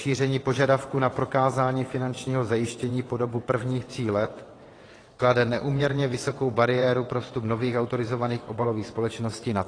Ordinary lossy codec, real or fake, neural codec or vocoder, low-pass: AAC, 32 kbps; fake; vocoder, 22.05 kHz, 80 mel bands, WaveNeXt; 9.9 kHz